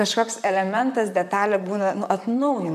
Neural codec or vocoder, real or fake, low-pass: vocoder, 44.1 kHz, 128 mel bands, Pupu-Vocoder; fake; 14.4 kHz